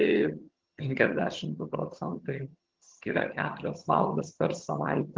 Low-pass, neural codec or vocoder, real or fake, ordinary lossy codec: 7.2 kHz; vocoder, 22.05 kHz, 80 mel bands, HiFi-GAN; fake; Opus, 16 kbps